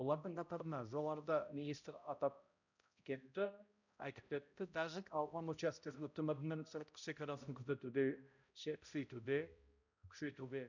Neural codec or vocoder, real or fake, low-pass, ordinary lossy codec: codec, 16 kHz, 0.5 kbps, X-Codec, HuBERT features, trained on balanced general audio; fake; 7.2 kHz; none